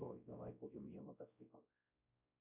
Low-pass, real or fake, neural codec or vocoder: 3.6 kHz; fake; codec, 16 kHz, 0.5 kbps, X-Codec, WavLM features, trained on Multilingual LibriSpeech